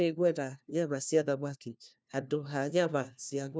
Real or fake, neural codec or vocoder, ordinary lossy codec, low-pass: fake; codec, 16 kHz, 1 kbps, FunCodec, trained on LibriTTS, 50 frames a second; none; none